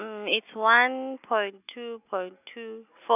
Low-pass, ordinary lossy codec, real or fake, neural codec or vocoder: 3.6 kHz; none; real; none